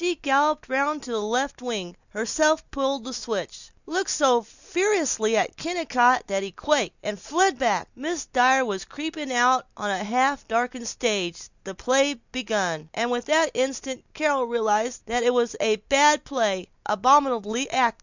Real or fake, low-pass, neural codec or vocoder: real; 7.2 kHz; none